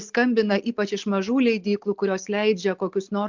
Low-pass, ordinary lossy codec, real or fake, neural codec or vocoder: 7.2 kHz; MP3, 64 kbps; real; none